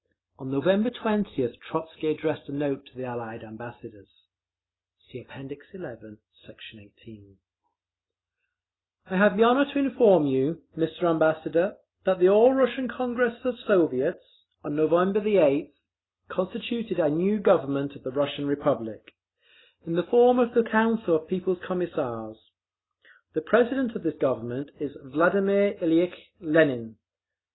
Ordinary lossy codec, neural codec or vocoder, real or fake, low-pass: AAC, 16 kbps; none; real; 7.2 kHz